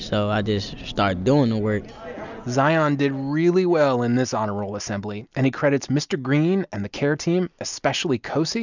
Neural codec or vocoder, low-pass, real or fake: none; 7.2 kHz; real